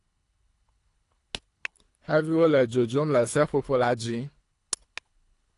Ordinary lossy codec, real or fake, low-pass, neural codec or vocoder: AAC, 48 kbps; fake; 10.8 kHz; codec, 24 kHz, 3 kbps, HILCodec